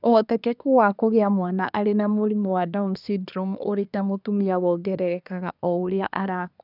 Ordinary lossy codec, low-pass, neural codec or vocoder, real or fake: none; 5.4 kHz; codec, 24 kHz, 1 kbps, SNAC; fake